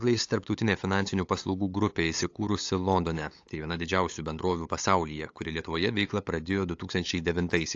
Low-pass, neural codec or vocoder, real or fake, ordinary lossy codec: 7.2 kHz; codec, 16 kHz, 8 kbps, FunCodec, trained on LibriTTS, 25 frames a second; fake; AAC, 48 kbps